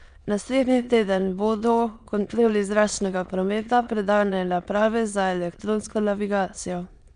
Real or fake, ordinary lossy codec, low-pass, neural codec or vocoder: fake; none; 9.9 kHz; autoencoder, 22.05 kHz, a latent of 192 numbers a frame, VITS, trained on many speakers